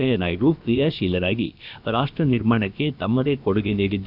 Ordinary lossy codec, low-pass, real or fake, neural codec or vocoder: none; 5.4 kHz; fake; codec, 16 kHz, about 1 kbps, DyCAST, with the encoder's durations